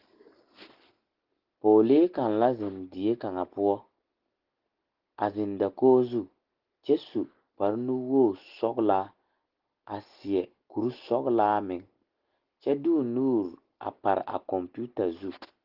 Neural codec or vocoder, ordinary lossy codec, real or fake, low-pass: none; Opus, 16 kbps; real; 5.4 kHz